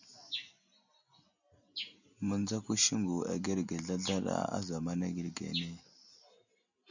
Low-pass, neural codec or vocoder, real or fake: 7.2 kHz; none; real